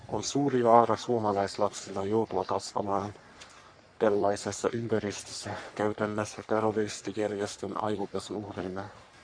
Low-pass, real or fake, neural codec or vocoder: 9.9 kHz; fake; codec, 44.1 kHz, 3.4 kbps, Pupu-Codec